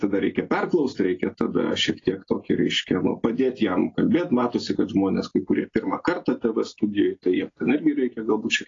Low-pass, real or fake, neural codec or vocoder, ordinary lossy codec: 7.2 kHz; real; none; AAC, 32 kbps